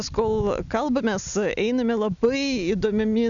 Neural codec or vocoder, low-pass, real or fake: none; 7.2 kHz; real